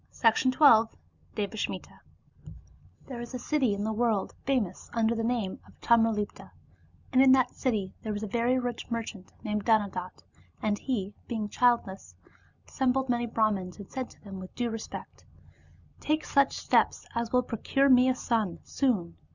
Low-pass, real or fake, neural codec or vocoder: 7.2 kHz; real; none